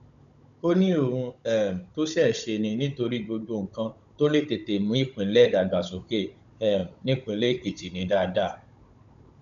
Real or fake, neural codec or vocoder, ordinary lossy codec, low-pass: fake; codec, 16 kHz, 16 kbps, FunCodec, trained on Chinese and English, 50 frames a second; none; 7.2 kHz